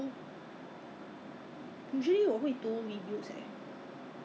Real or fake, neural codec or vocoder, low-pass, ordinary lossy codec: real; none; none; none